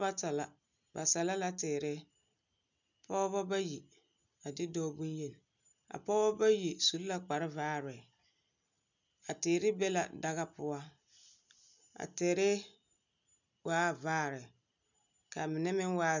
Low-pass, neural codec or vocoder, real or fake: 7.2 kHz; none; real